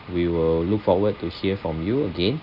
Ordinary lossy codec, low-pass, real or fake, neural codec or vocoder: none; 5.4 kHz; real; none